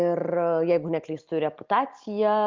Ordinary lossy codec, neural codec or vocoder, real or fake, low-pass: Opus, 32 kbps; none; real; 7.2 kHz